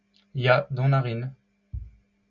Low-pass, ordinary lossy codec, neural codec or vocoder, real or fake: 7.2 kHz; MP3, 48 kbps; none; real